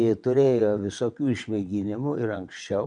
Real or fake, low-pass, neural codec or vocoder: fake; 9.9 kHz; vocoder, 22.05 kHz, 80 mel bands, WaveNeXt